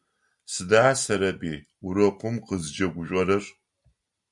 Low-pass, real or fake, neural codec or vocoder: 10.8 kHz; real; none